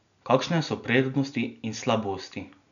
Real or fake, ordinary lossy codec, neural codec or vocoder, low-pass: real; none; none; 7.2 kHz